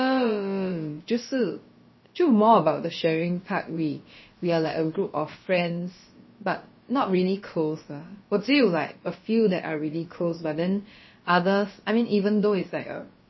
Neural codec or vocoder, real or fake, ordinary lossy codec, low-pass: codec, 16 kHz, about 1 kbps, DyCAST, with the encoder's durations; fake; MP3, 24 kbps; 7.2 kHz